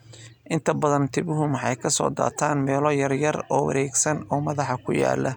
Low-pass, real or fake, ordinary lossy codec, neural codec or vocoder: 19.8 kHz; real; none; none